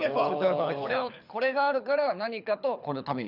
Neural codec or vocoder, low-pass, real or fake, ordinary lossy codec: codec, 24 kHz, 6 kbps, HILCodec; 5.4 kHz; fake; none